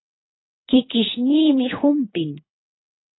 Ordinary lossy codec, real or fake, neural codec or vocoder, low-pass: AAC, 16 kbps; fake; codec, 24 kHz, 3 kbps, HILCodec; 7.2 kHz